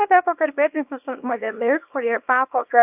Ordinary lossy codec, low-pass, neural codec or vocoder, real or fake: AAC, 32 kbps; 3.6 kHz; codec, 24 kHz, 0.9 kbps, WavTokenizer, small release; fake